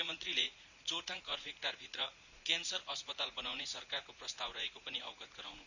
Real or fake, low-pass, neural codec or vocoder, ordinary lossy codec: fake; 7.2 kHz; vocoder, 44.1 kHz, 80 mel bands, Vocos; none